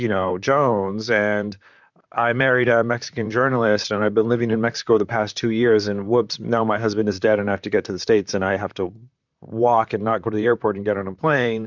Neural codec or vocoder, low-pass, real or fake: vocoder, 44.1 kHz, 128 mel bands, Pupu-Vocoder; 7.2 kHz; fake